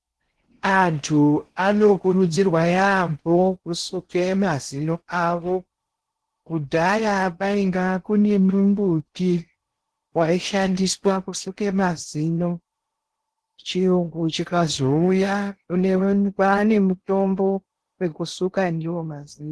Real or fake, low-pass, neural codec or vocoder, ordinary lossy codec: fake; 10.8 kHz; codec, 16 kHz in and 24 kHz out, 0.6 kbps, FocalCodec, streaming, 4096 codes; Opus, 16 kbps